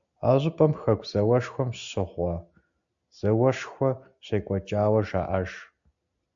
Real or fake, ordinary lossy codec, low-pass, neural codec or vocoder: real; MP3, 64 kbps; 7.2 kHz; none